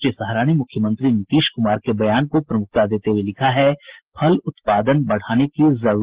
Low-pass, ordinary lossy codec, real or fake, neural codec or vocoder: 3.6 kHz; Opus, 16 kbps; real; none